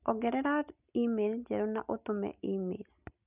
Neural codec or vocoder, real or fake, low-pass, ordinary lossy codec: none; real; 3.6 kHz; none